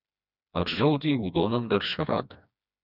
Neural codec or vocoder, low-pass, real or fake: codec, 16 kHz, 2 kbps, FreqCodec, smaller model; 5.4 kHz; fake